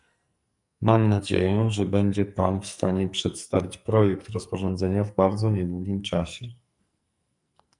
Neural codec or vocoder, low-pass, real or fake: codec, 44.1 kHz, 2.6 kbps, SNAC; 10.8 kHz; fake